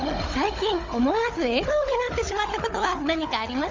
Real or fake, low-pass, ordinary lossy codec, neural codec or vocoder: fake; 7.2 kHz; Opus, 32 kbps; codec, 16 kHz, 16 kbps, FunCodec, trained on Chinese and English, 50 frames a second